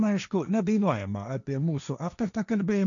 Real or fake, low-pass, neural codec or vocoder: fake; 7.2 kHz; codec, 16 kHz, 1.1 kbps, Voila-Tokenizer